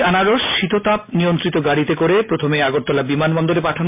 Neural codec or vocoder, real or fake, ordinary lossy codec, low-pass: none; real; MP3, 32 kbps; 3.6 kHz